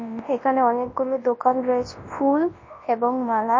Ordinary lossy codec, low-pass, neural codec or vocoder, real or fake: MP3, 32 kbps; 7.2 kHz; codec, 24 kHz, 0.9 kbps, WavTokenizer, large speech release; fake